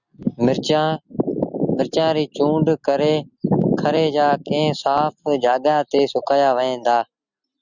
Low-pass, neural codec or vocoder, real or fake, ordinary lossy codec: 7.2 kHz; none; real; Opus, 64 kbps